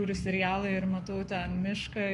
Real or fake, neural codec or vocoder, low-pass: fake; codec, 44.1 kHz, 7.8 kbps, Pupu-Codec; 10.8 kHz